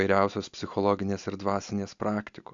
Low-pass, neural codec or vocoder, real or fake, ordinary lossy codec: 7.2 kHz; none; real; AAC, 64 kbps